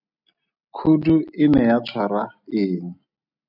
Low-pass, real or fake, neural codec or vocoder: 5.4 kHz; real; none